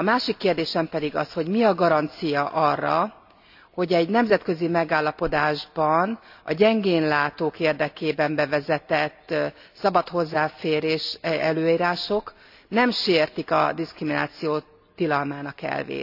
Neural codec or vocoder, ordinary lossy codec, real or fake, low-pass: none; AAC, 48 kbps; real; 5.4 kHz